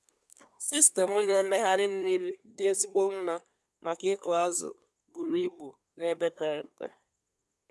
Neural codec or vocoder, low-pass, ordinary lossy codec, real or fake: codec, 24 kHz, 1 kbps, SNAC; none; none; fake